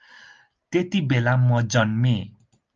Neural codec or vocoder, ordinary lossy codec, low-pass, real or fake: none; Opus, 24 kbps; 7.2 kHz; real